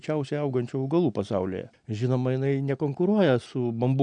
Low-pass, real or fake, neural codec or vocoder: 9.9 kHz; real; none